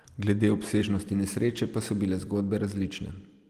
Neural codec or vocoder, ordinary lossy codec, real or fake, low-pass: none; Opus, 24 kbps; real; 14.4 kHz